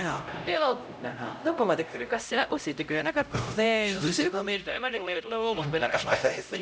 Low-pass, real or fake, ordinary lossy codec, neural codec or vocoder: none; fake; none; codec, 16 kHz, 0.5 kbps, X-Codec, HuBERT features, trained on LibriSpeech